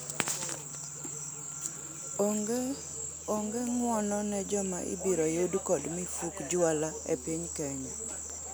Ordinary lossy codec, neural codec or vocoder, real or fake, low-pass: none; none; real; none